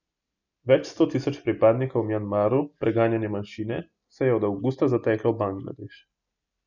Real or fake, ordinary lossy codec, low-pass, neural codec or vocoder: real; none; 7.2 kHz; none